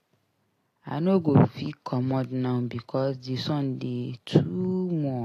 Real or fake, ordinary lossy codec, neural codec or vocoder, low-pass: real; AAC, 48 kbps; none; 14.4 kHz